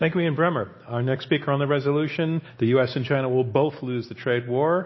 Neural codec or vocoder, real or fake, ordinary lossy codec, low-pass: none; real; MP3, 24 kbps; 7.2 kHz